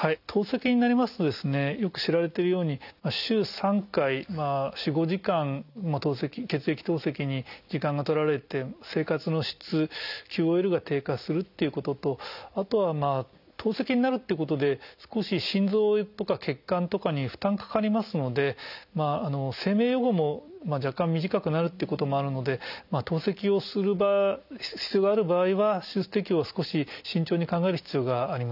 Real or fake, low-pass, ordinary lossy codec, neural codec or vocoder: real; 5.4 kHz; none; none